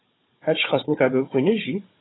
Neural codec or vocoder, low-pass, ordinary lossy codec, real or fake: codec, 16 kHz, 16 kbps, FunCodec, trained on Chinese and English, 50 frames a second; 7.2 kHz; AAC, 16 kbps; fake